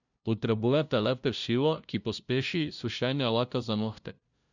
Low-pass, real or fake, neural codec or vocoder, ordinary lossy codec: 7.2 kHz; fake; codec, 16 kHz, 0.5 kbps, FunCodec, trained on LibriTTS, 25 frames a second; none